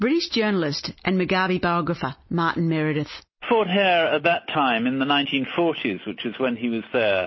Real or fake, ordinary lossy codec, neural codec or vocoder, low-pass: real; MP3, 24 kbps; none; 7.2 kHz